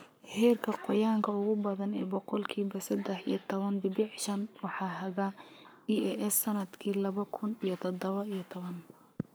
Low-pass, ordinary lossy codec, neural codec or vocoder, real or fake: none; none; codec, 44.1 kHz, 7.8 kbps, Pupu-Codec; fake